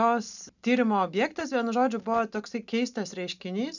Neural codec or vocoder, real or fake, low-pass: none; real; 7.2 kHz